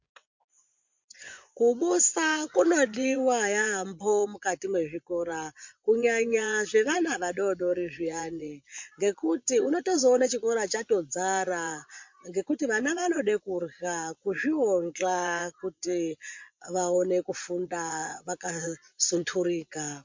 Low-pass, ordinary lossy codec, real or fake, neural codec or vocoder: 7.2 kHz; MP3, 48 kbps; fake; vocoder, 24 kHz, 100 mel bands, Vocos